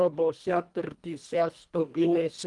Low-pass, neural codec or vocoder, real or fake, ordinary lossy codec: 10.8 kHz; codec, 24 kHz, 1.5 kbps, HILCodec; fake; Opus, 32 kbps